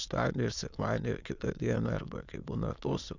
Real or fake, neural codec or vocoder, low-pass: fake; autoencoder, 22.05 kHz, a latent of 192 numbers a frame, VITS, trained on many speakers; 7.2 kHz